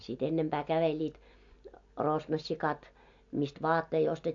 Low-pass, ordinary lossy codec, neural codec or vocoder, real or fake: 7.2 kHz; AAC, 64 kbps; none; real